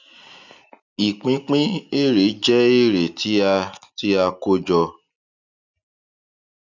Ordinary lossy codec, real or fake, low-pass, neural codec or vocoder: none; real; 7.2 kHz; none